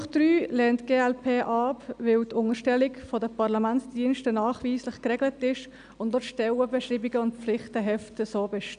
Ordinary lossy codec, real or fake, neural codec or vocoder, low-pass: none; real; none; 9.9 kHz